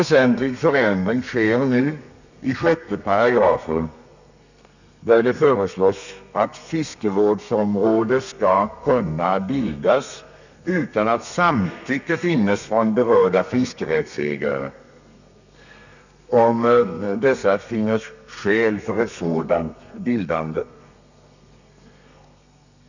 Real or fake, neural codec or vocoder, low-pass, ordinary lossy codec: fake; codec, 32 kHz, 1.9 kbps, SNAC; 7.2 kHz; none